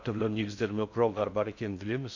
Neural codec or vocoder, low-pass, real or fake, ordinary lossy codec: codec, 16 kHz in and 24 kHz out, 0.6 kbps, FocalCodec, streaming, 4096 codes; 7.2 kHz; fake; none